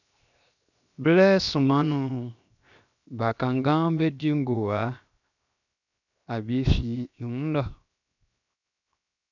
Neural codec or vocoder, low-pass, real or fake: codec, 16 kHz, 0.7 kbps, FocalCodec; 7.2 kHz; fake